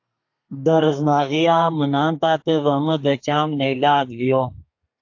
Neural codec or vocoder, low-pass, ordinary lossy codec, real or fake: codec, 32 kHz, 1.9 kbps, SNAC; 7.2 kHz; AAC, 48 kbps; fake